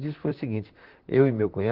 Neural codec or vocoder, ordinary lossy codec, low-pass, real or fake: vocoder, 44.1 kHz, 128 mel bands, Pupu-Vocoder; Opus, 16 kbps; 5.4 kHz; fake